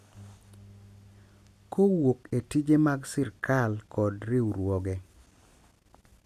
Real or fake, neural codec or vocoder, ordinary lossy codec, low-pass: real; none; none; 14.4 kHz